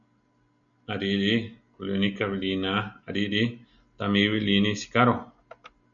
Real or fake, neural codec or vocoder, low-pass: real; none; 7.2 kHz